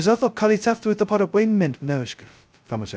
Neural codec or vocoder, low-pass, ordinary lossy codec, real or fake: codec, 16 kHz, 0.2 kbps, FocalCodec; none; none; fake